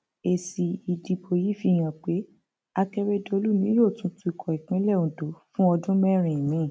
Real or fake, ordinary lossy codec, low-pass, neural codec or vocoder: real; none; none; none